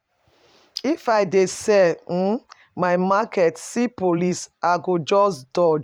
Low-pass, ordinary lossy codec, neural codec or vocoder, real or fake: 19.8 kHz; none; vocoder, 44.1 kHz, 128 mel bands every 512 samples, BigVGAN v2; fake